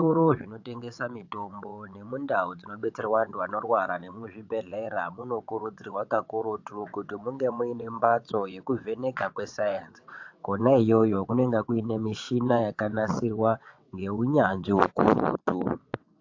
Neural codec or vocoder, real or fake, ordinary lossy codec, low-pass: vocoder, 22.05 kHz, 80 mel bands, WaveNeXt; fake; AAC, 48 kbps; 7.2 kHz